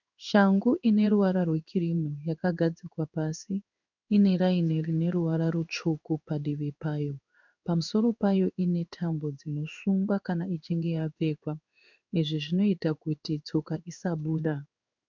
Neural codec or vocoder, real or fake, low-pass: codec, 16 kHz in and 24 kHz out, 1 kbps, XY-Tokenizer; fake; 7.2 kHz